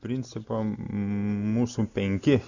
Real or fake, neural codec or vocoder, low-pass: real; none; 7.2 kHz